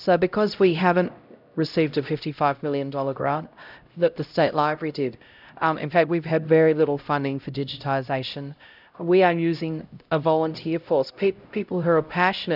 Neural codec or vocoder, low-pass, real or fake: codec, 16 kHz, 0.5 kbps, X-Codec, HuBERT features, trained on LibriSpeech; 5.4 kHz; fake